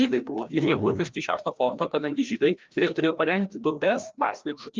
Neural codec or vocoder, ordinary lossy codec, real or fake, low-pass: codec, 16 kHz, 1 kbps, FreqCodec, larger model; Opus, 24 kbps; fake; 7.2 kHz